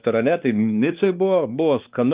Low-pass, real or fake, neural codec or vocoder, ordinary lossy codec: 3.6 kHz; fake; codec, 16 kHz, 2 kbps, X-Codec, WavLM features, trained on Multilingual LibriSpeech; Opus, 24 kbps